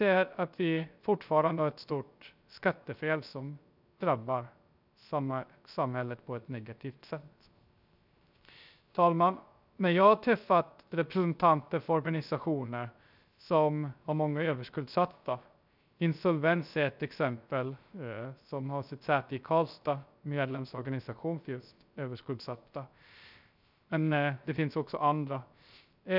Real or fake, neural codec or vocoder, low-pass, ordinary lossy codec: fake; codec, 16 kHz, 0.3 kbps, FocalCodec; 5.4 kHz; none